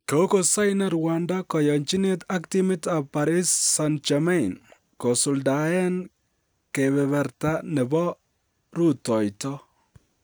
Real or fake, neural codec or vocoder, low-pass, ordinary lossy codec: real; none; none; none